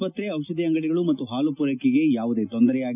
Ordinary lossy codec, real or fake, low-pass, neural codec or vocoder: none; real; 3.6 kHz; none